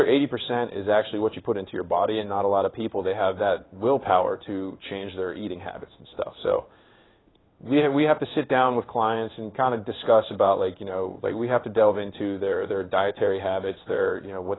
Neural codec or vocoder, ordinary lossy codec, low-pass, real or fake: codec, 16 kHz in and 24 kHz out, 1 kbps, XY-Tokenizer; AAC, 16 kbps; 7.2 kHz; fake